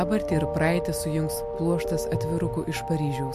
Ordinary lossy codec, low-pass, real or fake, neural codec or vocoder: MP3, 64 kbps; 14.4 kHz; real; none